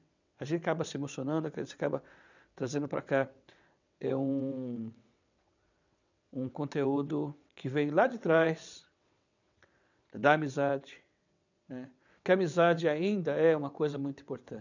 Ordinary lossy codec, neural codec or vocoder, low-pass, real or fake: none; vocoder, 22.05 kHz, 80 mel bands, WaveNeXt; 7.2 kHz; fake